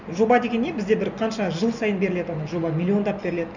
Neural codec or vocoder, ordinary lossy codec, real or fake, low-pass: none; none; real; 7.2 kHz